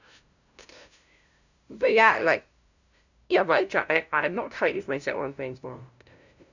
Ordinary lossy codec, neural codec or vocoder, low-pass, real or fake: none; codec, 16 kHz, 0.5 kbps, FunCodec, trained on LibriTTS, 25 frames a second; 7.2 kHz; fake